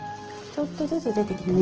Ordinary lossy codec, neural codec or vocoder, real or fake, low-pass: Opus, 16 kbps; none; real; 7.2 kHz